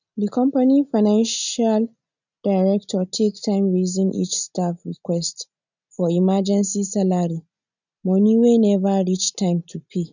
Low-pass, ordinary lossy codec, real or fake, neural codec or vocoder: 7.2 kHz; none; real; none